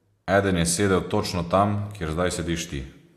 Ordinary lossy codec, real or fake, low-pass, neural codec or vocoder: AAC, 64 kbps; real; 14.4 kHz; none